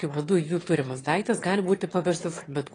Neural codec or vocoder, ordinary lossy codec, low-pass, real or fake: autoencoder, 22.05 kHz, a latent of 192 numbers a frame, VITS, trained on one speaker; AAC, 32 kbps; 9.9 kHz; fake